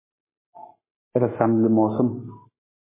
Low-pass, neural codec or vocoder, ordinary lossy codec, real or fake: 3.6 kHz; codec, 44.1 kHz, 7.8 kbps, Pupu-Codec; MP3, 16 kbps; fake